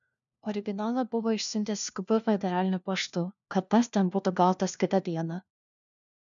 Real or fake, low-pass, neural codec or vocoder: fake; 7.2 kHz; codec, 16 kHz, 1 kbps, FunCodec, trained on LibriTTS, 50 frames a second